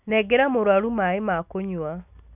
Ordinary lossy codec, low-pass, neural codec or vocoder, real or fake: none; 3.6 kHz; none; real